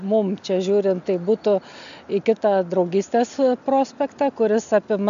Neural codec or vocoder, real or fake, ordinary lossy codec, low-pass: none; real; AAC, 96 kbps; 7.2 kHz